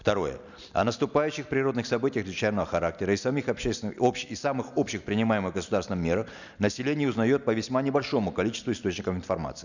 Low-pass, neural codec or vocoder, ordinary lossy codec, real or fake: 7.2 kHz; none; none; real